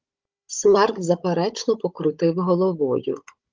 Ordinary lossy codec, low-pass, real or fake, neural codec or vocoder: Opus, 32 kbps; 7.2 kHz; fake; codec, 16 kHz, 16 kbps, FunCodec, trained on Chinese and English, 50 frames a second